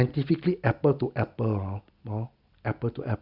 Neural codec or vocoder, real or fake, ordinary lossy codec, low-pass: none; real; Opus, 64 kbps; 5.4 kHz